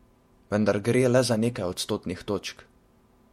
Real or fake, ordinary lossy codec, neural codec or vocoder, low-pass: fake; MP3, 64 kbps; vocoder, 48 kHz, 128 mel bands, Vocos; 19.8 kHz